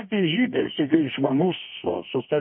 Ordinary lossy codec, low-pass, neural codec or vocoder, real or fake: MP3, 24 kbps; 5.4 kHz; codec, 24 kHz, 0.9 kbps, WavTokenizer, medium music audio release; fake